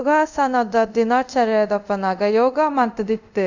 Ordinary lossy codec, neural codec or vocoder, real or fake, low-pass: none; codec, 24 kHz, 0.5 kbps, DualCodec; fake; 7.2 kHz